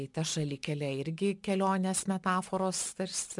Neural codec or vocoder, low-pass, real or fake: none; 10.8 kHz; real